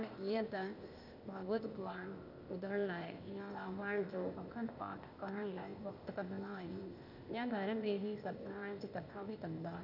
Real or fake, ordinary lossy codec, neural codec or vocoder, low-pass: fake; none; codec, 16 kHz, 0.8 kbps, ZipCodec; 5.4 kHz